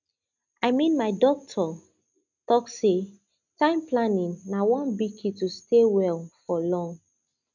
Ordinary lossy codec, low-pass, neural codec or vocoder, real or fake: none; 7.2 kHz; none; real